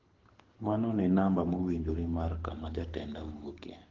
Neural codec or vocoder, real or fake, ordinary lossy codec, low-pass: codec, 24 kHz, 6 kbps, HILCodec; fake; Opus, 16 kbps; 7.2 kHz